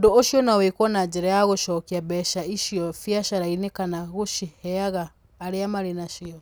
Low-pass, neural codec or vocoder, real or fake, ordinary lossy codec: none; none; real; none